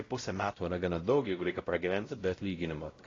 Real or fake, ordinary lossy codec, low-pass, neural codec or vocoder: fake; AAC, 32 kbps; 7.2 kHz; codec, 16 kHz, 0.5 kbps, X-Codec, WavLM features, trained on Multilingual LibriSpeech